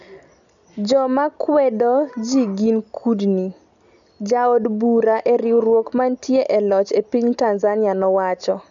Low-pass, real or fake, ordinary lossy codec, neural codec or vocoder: 7.2 kHz; real; none; none